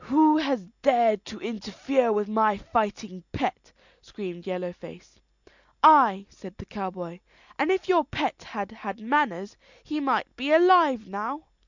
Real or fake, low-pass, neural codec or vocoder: real; 7.2 kHz; none